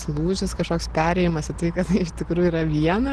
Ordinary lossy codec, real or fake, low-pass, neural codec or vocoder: Opus, 16 kbps; real; 10.8 kHz; none